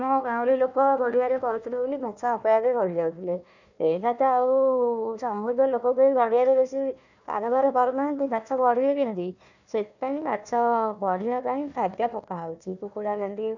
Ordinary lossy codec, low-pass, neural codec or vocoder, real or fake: none; 7.2 kHz; codec, 16 kHz, 1 kbps, FunCodec, trained on Chinese and English, 50 frames a second; fake